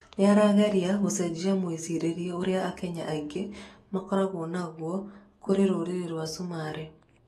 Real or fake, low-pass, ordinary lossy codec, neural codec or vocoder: fake; 19.8 kHz; AAC, 32 kbps; autoencoder, 48 kHz, 128 numbers a frame, DAC-VAE, trained on Japanese speech